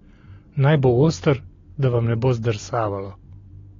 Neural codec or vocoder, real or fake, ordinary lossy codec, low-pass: none; real; AAC, 32 kbps; 7.2 kHz